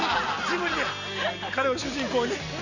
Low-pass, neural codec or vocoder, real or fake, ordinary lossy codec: 7.2 kHz; none; real; none